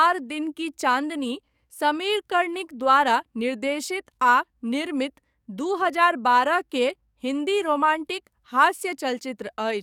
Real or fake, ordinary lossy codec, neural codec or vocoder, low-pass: fake; none; codec, 44.1 kHz, 7.8 kbps, DAC; 19.8 kHz